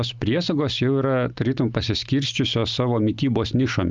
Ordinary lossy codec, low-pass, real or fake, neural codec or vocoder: Opus, 32 kbps; 7.2 kHz; real; none